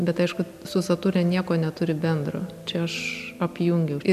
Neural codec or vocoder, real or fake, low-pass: none; real; 14.4 kHz